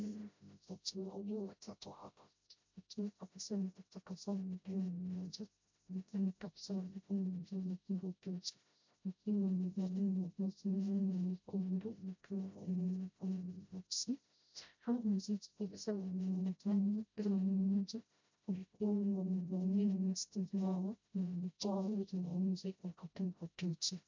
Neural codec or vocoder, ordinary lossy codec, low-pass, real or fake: codec, 16 kHz, 0.5 kbps, FreqCodec, smaller model; MP3, 64 kbps; 7.2 kHz; fake